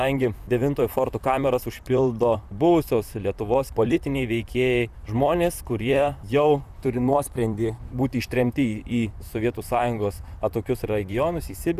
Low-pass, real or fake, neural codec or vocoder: 14.4 kHz; fake; vocoder, 44.1 kHz, 128 mel bands, Pupu-Vocoder